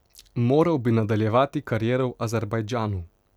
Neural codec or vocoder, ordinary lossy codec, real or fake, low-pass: none; none; real; 19.8 kHz